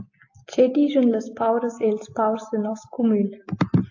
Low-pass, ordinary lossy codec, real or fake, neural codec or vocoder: 7.2 kHz; AAC, 48 kbps; real; none